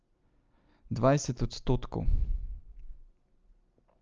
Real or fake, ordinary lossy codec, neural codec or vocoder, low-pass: real; Opus, 24 kbps; none; 7.2 kHz